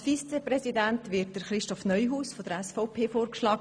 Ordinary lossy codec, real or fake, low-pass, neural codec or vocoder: none; real; 9.9 kHz; none